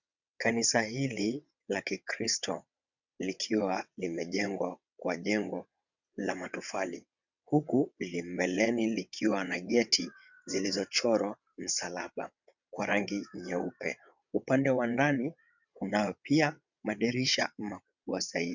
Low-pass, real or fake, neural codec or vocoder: 7.2 kHz; fake; vocoder, 22.05 kHz, 80 mel bands, WaveNeXt